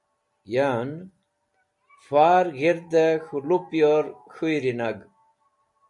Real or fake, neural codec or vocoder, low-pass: real; none; 10.8 kHz